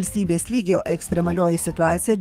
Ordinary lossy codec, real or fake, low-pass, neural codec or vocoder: Opus, 32 kbps; fake; 14.4 kHz; codec, 32 kHz, 1.9 kbps, SNAC